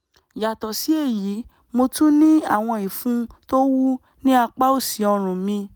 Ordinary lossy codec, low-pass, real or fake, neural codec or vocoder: none; none; real; none